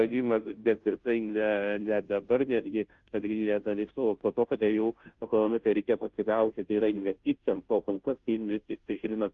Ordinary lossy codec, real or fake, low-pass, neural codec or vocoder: Opus, 32 kbps; fake; 7.2 kHz; codec, 16 kHz, 0.5 kbps, FunCodec, trained on Chinese and English, 25 frames a second